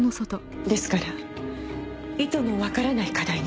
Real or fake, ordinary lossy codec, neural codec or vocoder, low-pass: real; none; none; none